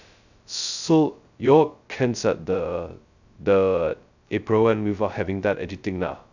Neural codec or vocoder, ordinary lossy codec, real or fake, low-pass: codec, 16 kHz, 0.2 kbps, FocalCodec; none; fake; 7.2 kHz